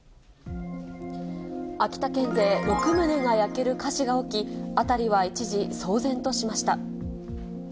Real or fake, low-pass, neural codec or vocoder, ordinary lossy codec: real; none; none; none